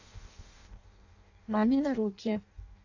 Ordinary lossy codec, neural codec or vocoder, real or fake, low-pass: AAC, 48 kbps; codec, 16 kHz in and 24 kHz out, 0.6 kbps, FireRedTTS-2 codec; fake; 7.2 kHz